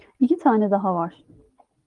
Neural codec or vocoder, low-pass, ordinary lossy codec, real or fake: none; 10.8 kHz; Opus, 24 kbps; real